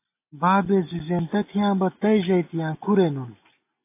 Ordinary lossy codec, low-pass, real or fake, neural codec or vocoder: AAC, 24 kbps; 3.6 kHz; real; none